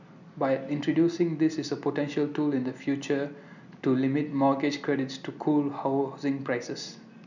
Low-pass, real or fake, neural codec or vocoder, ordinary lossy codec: 7.2 kHz; real; none; none